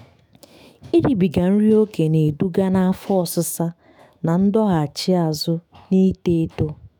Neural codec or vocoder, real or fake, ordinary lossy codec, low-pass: autoencoder, 48 kHz, 128 numbers a frame, DAC-VAE, trained on Japanese speech; fake; none; none